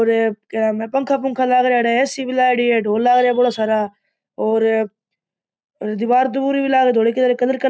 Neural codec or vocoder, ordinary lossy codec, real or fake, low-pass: none; none; real; none